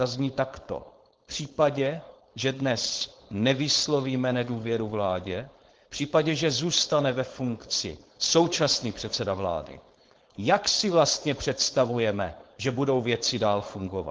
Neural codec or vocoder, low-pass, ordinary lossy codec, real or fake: codec, 16 kHz, 4.8 kbps, FACodec; 7.2 kHz; Opus, 16 kbps; fake